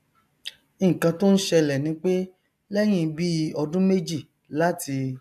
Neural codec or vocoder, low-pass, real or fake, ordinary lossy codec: none; 14.4 kHz; real; none